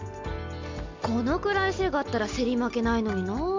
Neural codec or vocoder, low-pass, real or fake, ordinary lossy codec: none; 7.2 kHz; real; MP3, 64 kbps